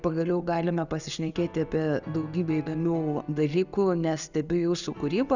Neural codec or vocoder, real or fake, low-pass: none; real; 7.2 kHz